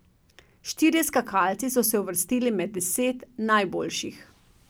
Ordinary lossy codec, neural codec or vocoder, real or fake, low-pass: none; none; real; none